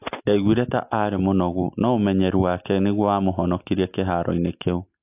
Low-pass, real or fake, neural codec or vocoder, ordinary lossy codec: 3.6 kHz; real; none; AAC, 32 kbps